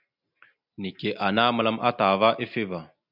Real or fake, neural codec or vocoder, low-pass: real; none; 5.4 kHz